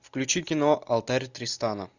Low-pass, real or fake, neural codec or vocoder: 7.2 kHz; real; none